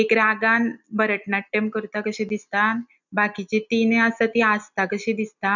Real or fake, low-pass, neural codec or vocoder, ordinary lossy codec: real; 7.2 kHz; none; none